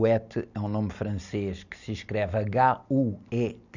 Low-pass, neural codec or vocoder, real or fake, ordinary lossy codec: 7.2 kHz; none; real; none